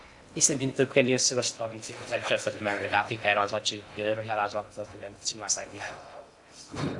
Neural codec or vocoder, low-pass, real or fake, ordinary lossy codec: codec, 16 kHz in and 24 kHz out, 0.6 kbps, FocalCodec, streaming, 2048 codes; 10.8 kHz; fake; AAC, 64 kbps